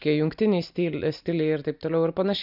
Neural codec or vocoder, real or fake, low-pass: none; real; 5.4 kHz